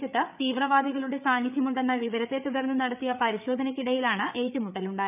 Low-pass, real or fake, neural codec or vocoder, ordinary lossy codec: 3.6 kHz; fake; codec, 16 kHz, 8 kbps, FreqCodec, larger model; none